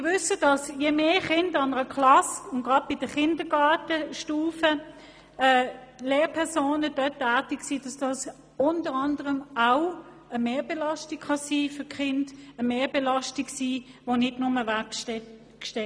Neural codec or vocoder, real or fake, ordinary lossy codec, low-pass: none; real; none; none